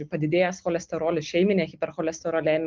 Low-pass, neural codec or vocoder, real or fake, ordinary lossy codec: 7.2 kHz; none; real; Opus, 24 kbps